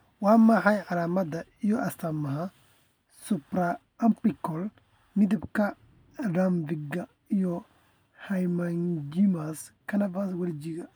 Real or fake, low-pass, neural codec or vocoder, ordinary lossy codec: real; none; none; none